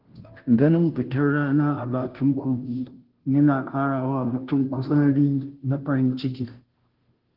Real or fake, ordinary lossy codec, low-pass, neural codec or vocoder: fake; Opus, 16 kbps; 5.4 kHz; codec, 16 kHz, 0.5 kbps, FunCodec, trained on Chinese and English, 25 frames a second